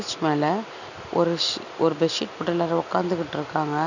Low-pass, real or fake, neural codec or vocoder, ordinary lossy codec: 7.2 kHz; real; none; none